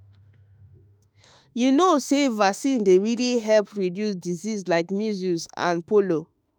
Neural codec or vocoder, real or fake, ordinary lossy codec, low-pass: autoencoder, 48 kHz, 32 numbers a frame, DAC-VAE, trained on Japanese speech; fake; none; none